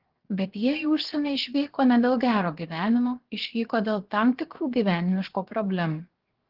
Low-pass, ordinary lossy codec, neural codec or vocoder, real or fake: 5.4 kHz; Opus, 16 kbps; codec, 16 kHz, 0.7 kbps, FocalCodec; fake